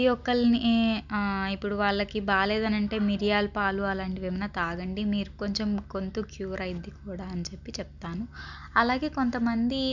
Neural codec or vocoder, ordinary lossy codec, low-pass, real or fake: none; none; 7.2 kHz; real